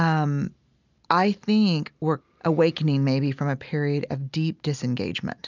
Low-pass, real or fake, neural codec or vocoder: 7.2 kHz; real; none